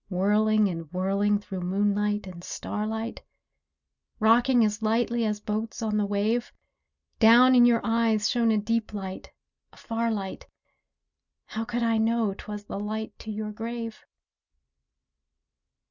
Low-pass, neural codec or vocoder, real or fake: 7.2 kHz; none; real